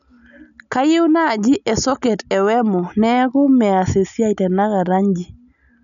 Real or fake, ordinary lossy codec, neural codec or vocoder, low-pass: real; none; none; 7.2 kHz